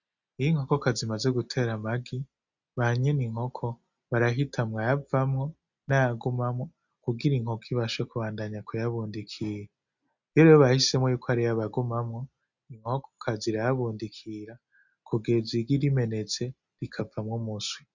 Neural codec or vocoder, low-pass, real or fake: none; 7.2 kHz; real